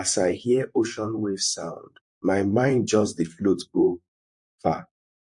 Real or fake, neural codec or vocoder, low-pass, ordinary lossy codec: fake; vocoder, 44.1 kHz, 128 mel bands, Pupu-Vocoder; 10.8 kHz; MP3, 48 kbps